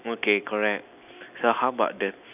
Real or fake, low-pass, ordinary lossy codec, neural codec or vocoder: real; 3.6 kHz; none; none